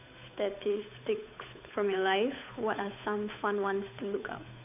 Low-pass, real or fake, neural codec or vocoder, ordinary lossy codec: 3.6 kHz; fake; codec, 16 kHz, 8 kbps, FunCodec, trained on Chinese and English, 25 frames a second; none